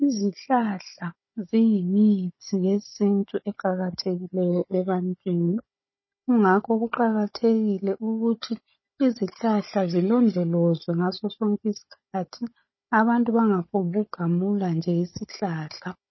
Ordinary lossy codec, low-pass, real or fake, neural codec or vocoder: MP3, 24 kbps; 7.2 kHz; fake; codec, 16 kHz, 16 kbps, FunCodec, trained on Chinese and English, 50 frames a second